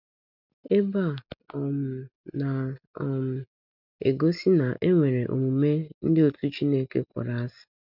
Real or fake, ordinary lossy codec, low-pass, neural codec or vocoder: real; none; 5.4 kHz; none